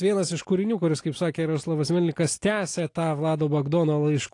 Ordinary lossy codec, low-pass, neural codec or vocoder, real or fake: AAC, 48 kbps; 10.8 kHz; none; real